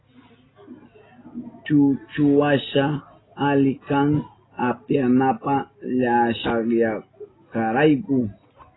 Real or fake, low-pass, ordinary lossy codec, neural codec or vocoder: real; 7.2 kHz; AAC, 16 kbps; none